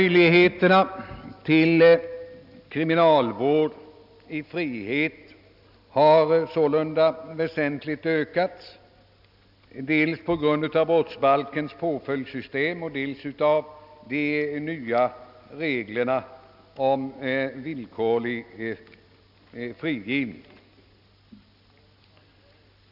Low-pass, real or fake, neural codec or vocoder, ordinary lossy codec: 5.4 kHz; real; none; none